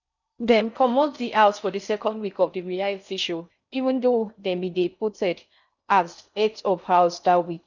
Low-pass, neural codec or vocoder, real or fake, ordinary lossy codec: 7.2 kHz; codec, 16 kHz in and 24 kHz out, 0.6 kbps, FocalCodec, streaming, 4096 codes; fake; none